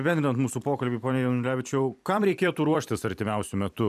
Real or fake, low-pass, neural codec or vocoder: fake; 14.4 kHz; vocoder, 44.1 kHz, 128 mel bands every 256 samples, BigVGAN v2